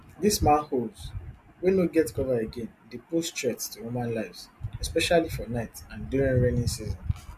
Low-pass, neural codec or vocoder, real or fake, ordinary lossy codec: 14.4 kHz; none; real; MP3, 64 kbps